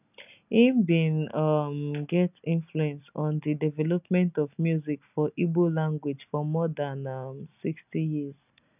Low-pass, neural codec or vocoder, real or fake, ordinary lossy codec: 3.6 kHz; none; real; none